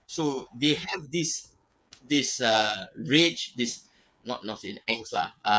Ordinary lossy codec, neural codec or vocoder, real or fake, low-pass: none; codec, 16 kHz, 8 kbps, FreqCodec, smaller model; fake; none